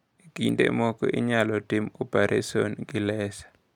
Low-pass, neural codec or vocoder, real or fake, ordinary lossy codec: 19.8 kHz; none; real; none